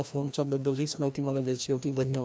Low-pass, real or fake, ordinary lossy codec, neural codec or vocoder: none; fake; none; codec, 16 kHz, 1 kbps, FreqCodec, larger model